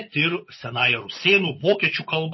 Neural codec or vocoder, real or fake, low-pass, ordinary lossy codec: vocoder, 24 kHz, 100 mel bands, Vocos; fake; 7.2 kHz; MP3, 24 kbps